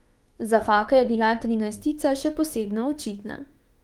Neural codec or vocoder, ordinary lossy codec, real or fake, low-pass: autoencoder, 48 kHz, 32 numbers a frame, DAC-VAE, trained on Japanese speech; Opus, 24 kbps; fake; 19.8 kHz